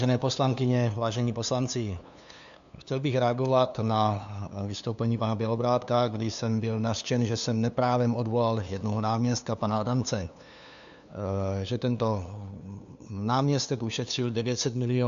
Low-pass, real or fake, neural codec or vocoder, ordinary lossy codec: 7.2 kHz; fake; codec, 16 kHz, 2 kbps, FunCodec, trained on LibriTTS, 25 frames a second; AAC, 96 kbps